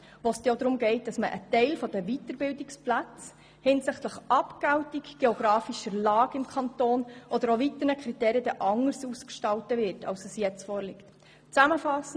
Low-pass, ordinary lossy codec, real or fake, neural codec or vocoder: none; none; real; none